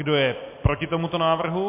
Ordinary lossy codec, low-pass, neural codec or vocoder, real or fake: MP3, 32 kbps; 3.6 kHz; none; real